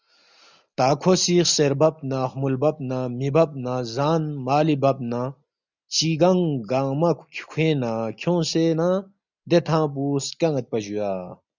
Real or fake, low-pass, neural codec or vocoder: real; 7.2 kHz; none